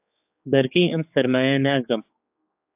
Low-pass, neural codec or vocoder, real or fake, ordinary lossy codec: 3.6 kHz; codec, 16 kHz, 4 kbps, X-Codec, HuBERT features, trained on general audio; fake; AAC, 32 kbps